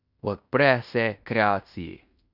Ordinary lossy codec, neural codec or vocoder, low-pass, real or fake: none; codec, 16 kHz in and 24 kHz out, 0.9 kbps, LongCat-Audio-Codec, fine tuned four codebook decoder; 5.4 kHz; fake